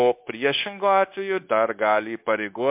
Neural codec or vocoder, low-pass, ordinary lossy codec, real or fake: codec, 16 kHz, 0.9 kbps, LongCat-Audio-Codec; 3.6 kHz; MP3, 32 kbps; fake